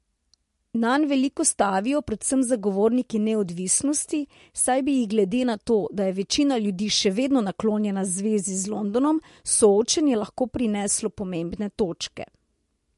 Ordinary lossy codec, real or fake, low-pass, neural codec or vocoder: MP3, 48 kbps; real; 14.4 kHz; none